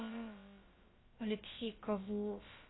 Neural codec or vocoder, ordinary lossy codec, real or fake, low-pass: codec, 16 kHz, about 1 kbps, DyCAST, with the encoder's durations; AAC, 16 kbps; fake; 7.2 kHz